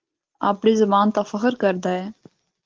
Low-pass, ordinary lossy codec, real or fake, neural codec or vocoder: 7.2 kHz; Opus, 16 kbps; real; none